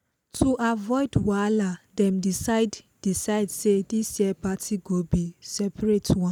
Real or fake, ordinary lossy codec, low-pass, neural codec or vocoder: real; none; none; none